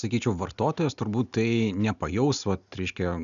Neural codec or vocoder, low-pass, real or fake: none; 7.2 kHz; real